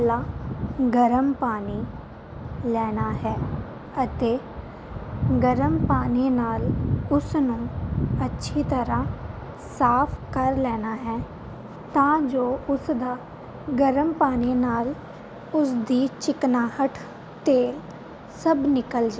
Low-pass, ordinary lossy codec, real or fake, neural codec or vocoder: none; none; real; none